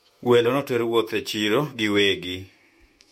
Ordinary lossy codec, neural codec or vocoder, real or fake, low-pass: MP3, 64 kbps; autoencoder, 48 kHz, 128 numbers a frame, DAC-VAE, trained on Japanese speech; fake; 19.8 kHz